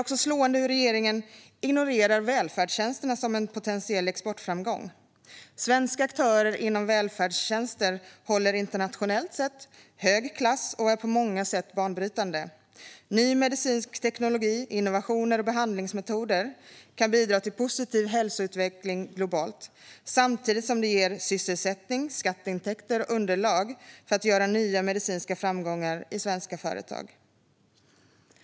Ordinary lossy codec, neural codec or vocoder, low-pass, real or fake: none; none; none; real